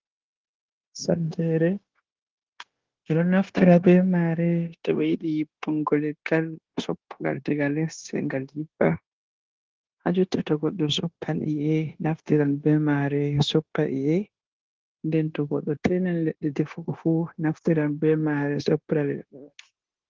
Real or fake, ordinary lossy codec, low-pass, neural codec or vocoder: fake; Opus, 16 kbps; 7.2 kHz; codec, 16 kHz, 0.9 kbps, LongCat-Audio-Codec